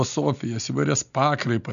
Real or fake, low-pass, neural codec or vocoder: real; 7.2 kHz; none